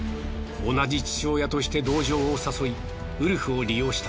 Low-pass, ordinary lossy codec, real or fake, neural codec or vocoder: none; none; real; none